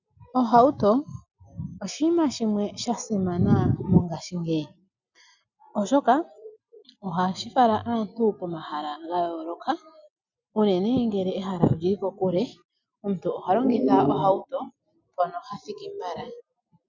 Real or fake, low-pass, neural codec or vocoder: real; 7.2 kHz; none